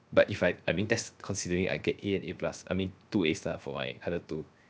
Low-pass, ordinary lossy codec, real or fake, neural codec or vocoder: none; none; fake; codec, 16 kHz, 0.7 kbps, FocalCodec